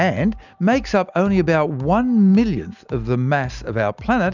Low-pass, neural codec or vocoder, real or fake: 7.2 kHz; none; real